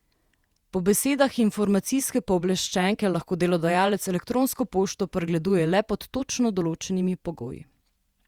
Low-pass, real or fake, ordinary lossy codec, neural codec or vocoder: 19.8 kHz; fake; Opus, 64 kbps; vocoder, 48 kHz, 128 mel bands, Vocos